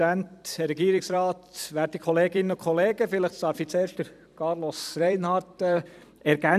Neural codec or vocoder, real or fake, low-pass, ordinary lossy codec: vocoder, 44.1 kHz, 128 mel bands every 256 samples, BigVGAN v2; fake; 14.4 kHz; none